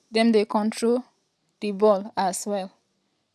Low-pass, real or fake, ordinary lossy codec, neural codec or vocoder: none; real; none; none